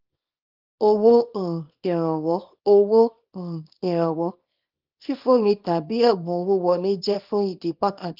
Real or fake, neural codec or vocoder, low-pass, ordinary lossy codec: fake; codec, 24 kHz, 0.9 kbps, WavTokenizer, small release; 5.4 kHz; Opus, 32 kbps